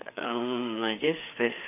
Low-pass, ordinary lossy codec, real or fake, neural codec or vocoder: 3.6 kHz; none; fake; codec, 16 kHz, 4 kbps, FreqCodec, larger model